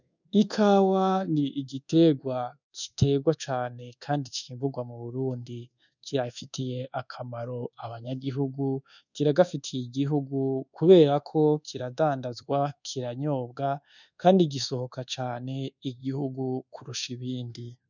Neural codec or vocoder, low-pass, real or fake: codec, 24 kHz, 1.2 kbps, DualCodec; 7.2 kHz; fake